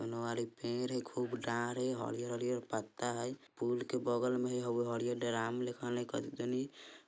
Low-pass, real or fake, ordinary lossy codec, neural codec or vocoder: none; real; none; none